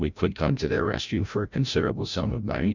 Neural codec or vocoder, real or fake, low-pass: codec, 16 kHz, 0.5 kbps, FreqCodec, larger model; fake; 7.2 kHz